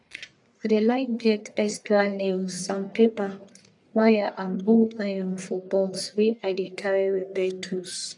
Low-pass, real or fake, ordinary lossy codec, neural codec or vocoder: 10.8 kHz; fake; none; codec, 44.1 kHz, 1.7 kbps, Pupu-Codec